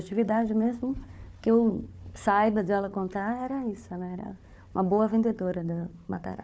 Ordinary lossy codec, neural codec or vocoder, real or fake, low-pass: none; codec, 16 kHz, 4 kbps, FunCodec, trained on Chinese and English, 50 frames a second; fake; none